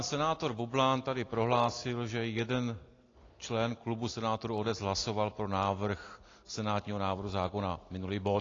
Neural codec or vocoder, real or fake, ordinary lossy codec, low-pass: none; real; AAC, 32 kbps; 7.2 kHz